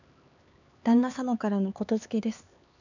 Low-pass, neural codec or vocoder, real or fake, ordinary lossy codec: 7.2 kHz; codec, 16 kHz, 4 kbps, X-Codec, HuBERT features, trained on LibriSpeech; fake; none